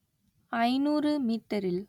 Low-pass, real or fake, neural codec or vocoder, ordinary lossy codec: 19.8 kHz; real; none; none